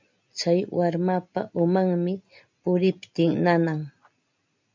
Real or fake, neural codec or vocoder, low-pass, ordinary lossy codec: real; none; 7.2 kHz; MP3, 64 kbps